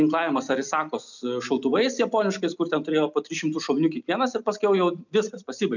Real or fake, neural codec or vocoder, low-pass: real; none; 7.2 kHz